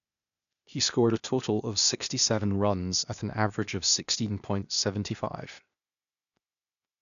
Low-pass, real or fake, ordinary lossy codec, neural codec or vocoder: 7.2 kHz; fake; none; codec, 16 kHz, 0.8 kbps, ZipCodec